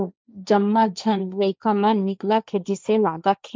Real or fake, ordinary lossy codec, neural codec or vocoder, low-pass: fake; none; codec, 16 kHz, 1.1 kbps, Voila-Tokenizer; none